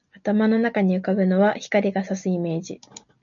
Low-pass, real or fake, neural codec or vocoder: 7.2 kHz; real; none